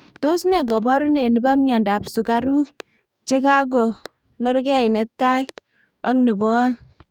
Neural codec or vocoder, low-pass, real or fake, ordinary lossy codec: codec, 44.1 kHz, 2.6 kbps, DAC; 19.8 kHz; fake; none